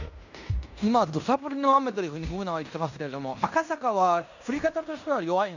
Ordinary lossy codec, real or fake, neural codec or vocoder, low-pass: none; fake; codec, 16 kHz in and 24 kHz out, 0.9 kbps, LongCat-Audio-Codec, fine tuned four codebook decoder; 7.2 kHz